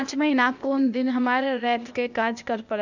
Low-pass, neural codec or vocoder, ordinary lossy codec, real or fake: 7.2 kHz; codec, 16 kHz, 0.8 kbps, ZipCodec; none; fake